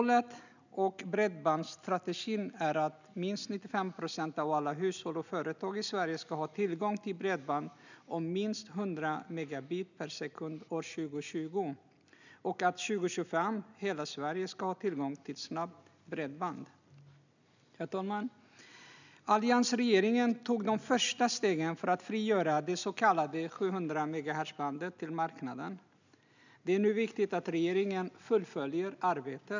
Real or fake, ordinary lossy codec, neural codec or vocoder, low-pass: real; none; none; 7.2 kHz